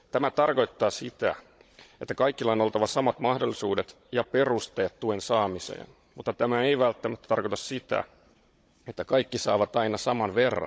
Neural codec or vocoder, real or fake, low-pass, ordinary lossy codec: codec, 16 kHz, 16 kbps, FunCodec, trained on Chinese and English, 50 frames a second; fake; none; none